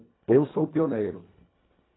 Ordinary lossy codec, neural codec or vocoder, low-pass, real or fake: AAC, 16 kbps; codec, 24 kHz, 1.5 kbps, HILCodec; 7.2 kHz; fake